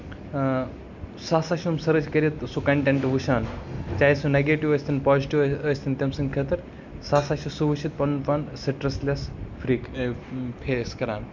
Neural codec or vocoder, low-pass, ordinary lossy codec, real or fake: none; 7.2 kHz; none; real